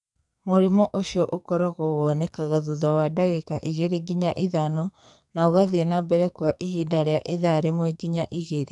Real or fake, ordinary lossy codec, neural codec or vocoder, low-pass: fake; none; codec, 44.1 kHz, 2.6 kbps, SNAC; 10.8 kHz